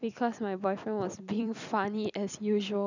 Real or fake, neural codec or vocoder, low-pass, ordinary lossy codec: fake; vocoder, 44.1 kHz, 128 mel bands every 256 samples, BigVGAN v2; 7.2 kHz; none